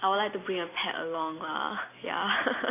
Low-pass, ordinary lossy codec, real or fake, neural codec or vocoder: 3.6 kHz; AAC, 24 kbps; real; none